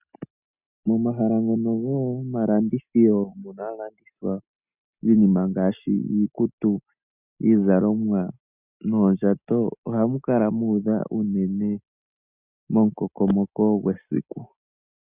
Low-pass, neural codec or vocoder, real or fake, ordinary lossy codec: 3.6 kHz; none; real; Opus, 64 kbps